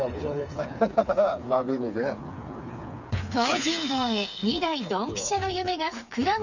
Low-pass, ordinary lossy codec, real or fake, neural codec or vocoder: 7.2 kHz; none; fake; codec, 16 kHz, 4 kbps, FreqCodec, smaller model